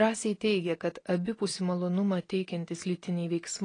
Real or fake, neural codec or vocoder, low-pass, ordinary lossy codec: real; none; 9.9 kHz; AAC, 32 kbps